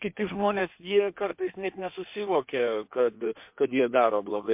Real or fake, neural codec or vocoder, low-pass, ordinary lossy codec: fake; codec, 16 kHz in and 24 kHz out, 1.1 kbps, FireRedTTS-2 codec; 3.6 kHz; MP3, 32 kbps